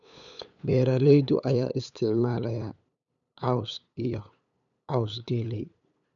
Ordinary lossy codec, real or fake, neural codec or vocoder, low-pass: none; fake; codec, 16 kHz, 8 kbps, FunCodec, trained on LibriTTS, 25 frames a second; 7.2 kHz